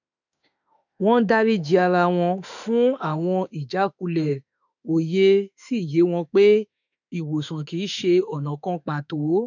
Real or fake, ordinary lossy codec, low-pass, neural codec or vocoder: fake; none; 7.2 kHz; autoencoder, 48 kHz, 32 numbers a frame, DAC-VAE, trained on Japanese speech